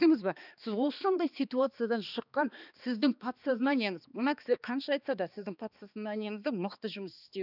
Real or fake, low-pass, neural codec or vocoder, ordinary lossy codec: fake; 5.4 kHz; codec, 16 kHz, 2 kbps, X-Codec, HuBERT features, trained on balanced general audio; none